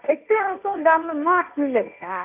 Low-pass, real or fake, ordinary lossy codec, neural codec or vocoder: 3.6 kHz; fake; none; codec, 16 kHz, 1.1 kbps, Voila-Tokenizer